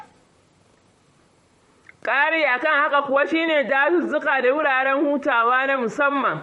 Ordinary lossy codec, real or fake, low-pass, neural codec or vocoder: MP3, 48 kbps; fake; 19.8 kHz; vocoder, 44.1 kHz, 128 mel bands, Pupu-Vocoder